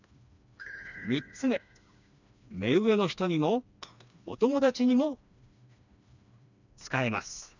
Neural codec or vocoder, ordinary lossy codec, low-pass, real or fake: codec, 16 kHz, 2 kbps, FreqCodec, smaller model; none; 7.2 kHz; fake